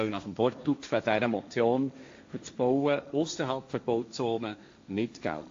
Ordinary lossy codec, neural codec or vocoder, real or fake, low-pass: AAC, 48 kbps; codec, 16 kHz, 1.1 kbps, Voila-Tokenizer; fake; 7.2 kHz